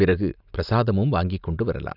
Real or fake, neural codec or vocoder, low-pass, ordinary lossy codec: real; none; 5.4 kHz; none